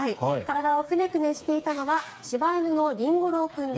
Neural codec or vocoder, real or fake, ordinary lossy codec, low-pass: codec, 16 kHz, 4 kbps, FreqCodec, smaller model; fake; none; none